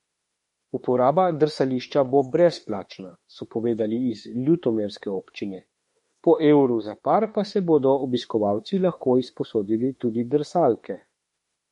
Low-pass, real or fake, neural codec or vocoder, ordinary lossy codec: 19.8 kHz; fake; autoencoder, 48 kHz, 32 numbers a frame, DAC-VAE, trained on Japanese speech; MP3, 48 kbps